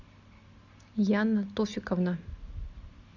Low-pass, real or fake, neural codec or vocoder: 7.2 kHz; real; none